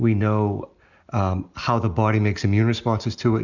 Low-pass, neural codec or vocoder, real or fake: 7.2 kHz; none; real